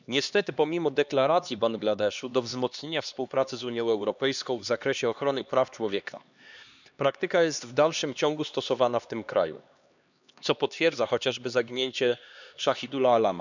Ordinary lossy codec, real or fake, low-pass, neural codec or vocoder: none; fake; 7.2 kHz; codec, 16 kHz, 2 kbps, X-Codec, HuBERT features, trained on LibriSpeech